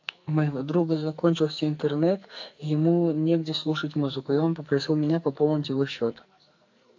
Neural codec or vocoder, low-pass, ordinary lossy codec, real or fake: codec, 44.1 kHz, 2.6 kbps, SNAC; 7.2 kHz; AAC, 48 kbps; fake